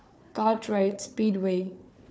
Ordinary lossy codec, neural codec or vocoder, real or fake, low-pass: none; codec, 16 kHz, 4 kbps, FunCodec, trained on Chinese and English, 50 frames a second; fake; none